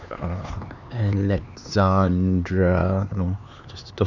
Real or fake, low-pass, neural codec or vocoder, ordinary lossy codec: fake; 7.2 kHz; codec, 16 kHz, 4 kbps, X-Codec, HuBERT features, trained on LibriSpeech; none